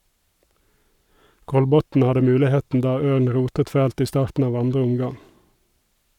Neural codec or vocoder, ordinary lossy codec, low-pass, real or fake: codec, 44.1 kHz, 7.8 kbps, Pupu-Codec; none; 19.8 kHz; fake